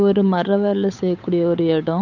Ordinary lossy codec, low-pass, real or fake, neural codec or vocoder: none; 7.2 kHz; fake; codec, 16 kHz, 8 kbps, FunCodec, trained on Chinese and English, 25 frames a second